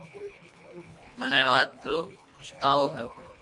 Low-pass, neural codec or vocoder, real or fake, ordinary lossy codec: 10.8 kHz; codec, 24 kHz, 1.5 kbps, HILCodec; fake; MP3, 64 kbps